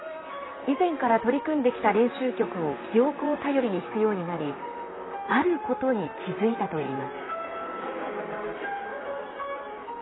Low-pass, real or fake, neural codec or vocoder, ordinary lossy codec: 7.2 kHz; fake; vocoder, 44.1 kHz, 128 mel bands, Pupu-Vocoder; AAC, 16 kbps